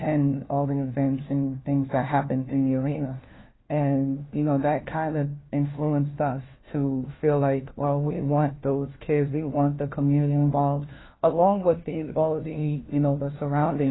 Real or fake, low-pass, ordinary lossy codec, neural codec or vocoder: fake; 7.2 kHz; AAC, 16 kbps; codec, 16 kHz, 1 kbps, FunCodec, trained on LibriTTS, 50 frames a second